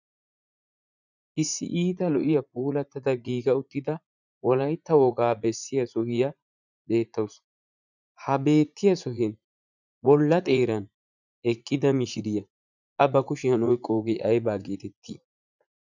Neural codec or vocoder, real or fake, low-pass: vocoder, 44.1 kHz, 80 mel bands, Vocos; fake; 7.2 kHz